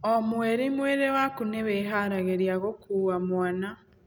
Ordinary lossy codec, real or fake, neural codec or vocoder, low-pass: none; real; none; none